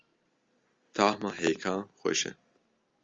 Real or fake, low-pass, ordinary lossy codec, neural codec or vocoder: real; 7.2 kHz; Opus, 32 kbps; none